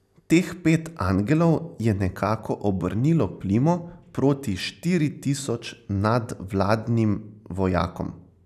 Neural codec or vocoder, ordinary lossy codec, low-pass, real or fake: none; none; 14.4 kHz; real